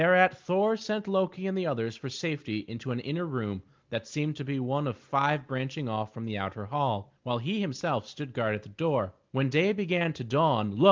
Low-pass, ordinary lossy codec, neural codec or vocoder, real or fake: 7.2 kHz; Opus, 24 kbps; none; real